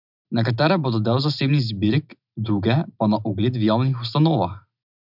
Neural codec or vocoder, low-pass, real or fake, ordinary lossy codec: none; 5.4 kHz; real; none